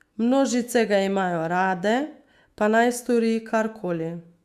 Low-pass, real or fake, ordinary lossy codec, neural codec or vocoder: 14.4 kHz; fake; Opus, 64 kbps; autoencoder, 48 kHz, 128 numbers a frame, DAC-VAE, trained on Japanese speech